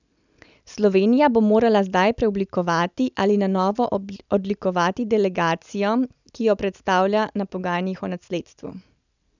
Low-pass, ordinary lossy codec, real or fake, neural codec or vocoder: 7.2 kHz; none; real; none